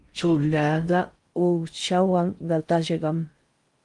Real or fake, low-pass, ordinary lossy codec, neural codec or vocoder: fake; 10.8 kHz; Opus, 32 kbps; codec, 16 kHz in and 24 kHz out, 0.6 kbps, FocalCodec, streaming, 4096 codes